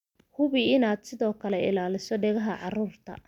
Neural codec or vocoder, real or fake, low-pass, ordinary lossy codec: none; real; 19.8 kHz; none